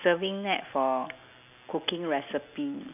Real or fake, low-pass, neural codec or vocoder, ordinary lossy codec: real; 3.6 kHz; none; none